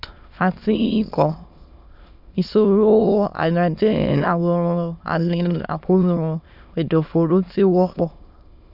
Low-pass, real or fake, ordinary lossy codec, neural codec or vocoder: 5.4 kHz; fake; none; autoencoder, 22.05 kHz, a latent of 192 numbers a frame, VITS, trained on many speakers